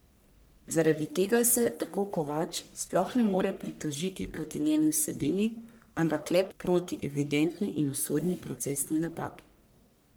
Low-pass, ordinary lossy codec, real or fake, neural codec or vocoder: none; none; fake; codec, 44.1 kHz, 1.7 kbps, Pupu-Codec